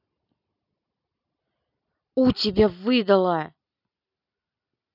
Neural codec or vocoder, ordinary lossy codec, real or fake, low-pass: none; none; real; 5.4 kHz